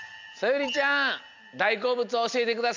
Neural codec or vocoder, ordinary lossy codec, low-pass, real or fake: none; MP3, 64 kbps; 7.2 kHz; real